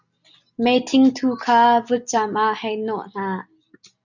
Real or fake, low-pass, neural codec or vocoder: real; 7.2 kHz; none